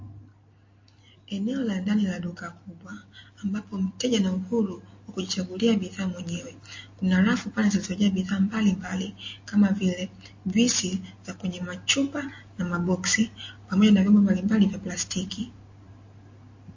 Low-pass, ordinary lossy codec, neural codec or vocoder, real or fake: 7.2 kHz; MP3, 32 kbps; none; real